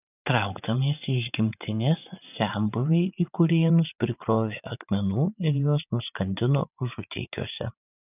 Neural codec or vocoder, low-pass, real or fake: vocoder, 44.1 kHz, 80 mel bands, Vocos; 3.6 kHz; fake